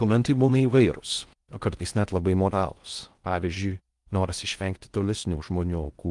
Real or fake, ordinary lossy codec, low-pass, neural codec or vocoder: fake; Opus, 32 kbps; 10.8 kHz; codec, 16 kHz in and 24 kHz out, 0.6 kbps, FocalCodec, streaming, 4096 codes